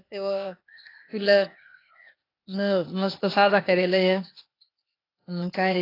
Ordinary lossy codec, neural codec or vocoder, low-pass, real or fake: AAC, 24 kbps; codec, 16 kHz, 0.8 kbps, ZipCodec; 5.4 kHz; fake